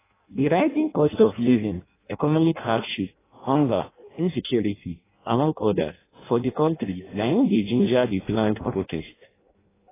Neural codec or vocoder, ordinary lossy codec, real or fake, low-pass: codec, 16 kHz in and 24 kHz out, 0.6 kbps, FireRedTTS-2 codec; AAC, 16 kbps; fake; 3.6 kHz